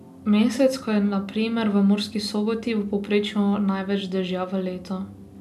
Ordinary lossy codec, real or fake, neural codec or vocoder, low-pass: none; real; none; 14.4 kHz